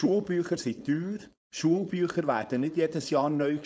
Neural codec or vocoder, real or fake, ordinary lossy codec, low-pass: codec, 16 kHz, 4.8 kbps, FACodec; fake; none; none